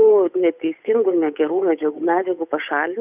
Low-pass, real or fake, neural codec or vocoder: 3.6 kHz; fake; codec, 16 kHz, 8 kbps, FunCodec, trained on Chinese and English, 25 frames a second